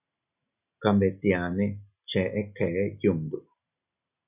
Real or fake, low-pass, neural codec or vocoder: real; 3.6 kHz; none